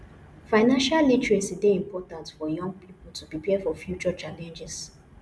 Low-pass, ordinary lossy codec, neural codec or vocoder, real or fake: none; none; none; real